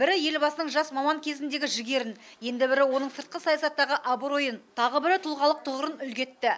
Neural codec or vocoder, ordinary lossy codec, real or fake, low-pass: none; none; real; none